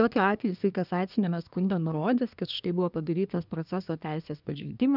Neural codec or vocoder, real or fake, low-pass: codec, 24 kHz, 1 kbps, SNAC; fake; 5.4 kHz